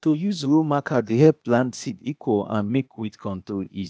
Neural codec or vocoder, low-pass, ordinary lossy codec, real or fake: codec, 16 kHz, 0.8 kbps, ZipCodec; none; none; fake